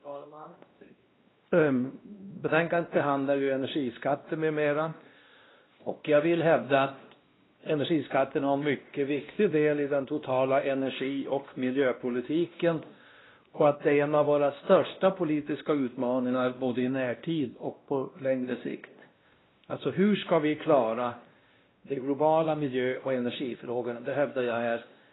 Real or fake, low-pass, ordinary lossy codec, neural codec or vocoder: fake; 7.2 kHz; AAC, 16 kbps; codec, 16 kHz, 1 kbps, X-Codec, WavLM features, trained on Multilingual LibriSpeech